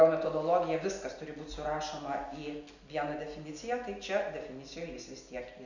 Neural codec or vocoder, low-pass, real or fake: none; 7.2 kHz; real